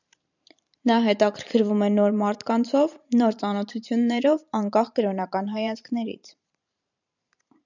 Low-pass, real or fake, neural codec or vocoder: 7.2 kHz; fake; vocoder, 44.1 kHz, 128 mel bands every 512 samples, BigVGAN v2